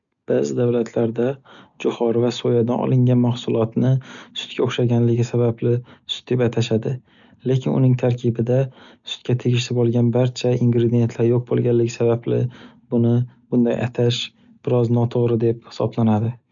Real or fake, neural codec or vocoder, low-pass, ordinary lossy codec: real; none; 7.2 kHz; none